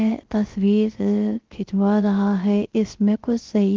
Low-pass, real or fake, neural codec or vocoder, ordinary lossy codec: 7.2 kHz; fake; codec, 16 kHz, 0.3 kbps, FocalCodec; Opus, 16 kbps